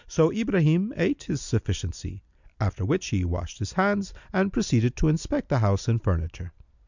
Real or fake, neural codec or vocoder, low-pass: real; none; 7.2 kHz